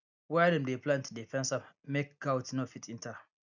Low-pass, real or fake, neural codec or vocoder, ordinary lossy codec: 7.2 kHz; real; none; none